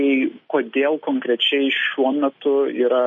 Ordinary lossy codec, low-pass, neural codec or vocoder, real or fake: MP3, 32 kbps; 7.2 kHz; none; real